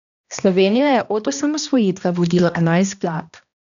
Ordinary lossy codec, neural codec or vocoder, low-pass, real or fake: none; codec, 16 kHz, 1 kbps, X-Codec, HuBERT features, trained on balanced general audio; 7.2 kHz; fake